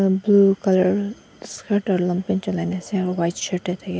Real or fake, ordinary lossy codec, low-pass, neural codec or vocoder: real; none; none; none